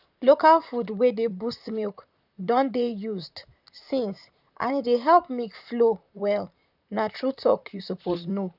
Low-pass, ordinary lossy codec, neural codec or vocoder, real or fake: 5.4 kHz; none; vocoder, 44.1 kHz, 128 mel bands, Pupu-Vocoder; fake